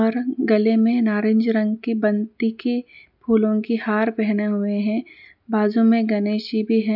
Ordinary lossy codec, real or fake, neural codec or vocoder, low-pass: none; real; none; 5.4 kHz